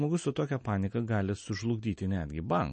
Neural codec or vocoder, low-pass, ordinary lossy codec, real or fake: none; 10.8 kHz; MP3, 32 kbps; real